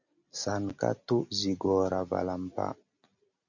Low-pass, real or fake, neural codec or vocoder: 7.2 kHz; real; none